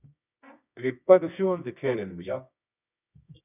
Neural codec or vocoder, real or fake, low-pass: codec, 24 kHz, 0.9 kbps, WavTokenizer, medium music audio release; fake; 3.6 kHz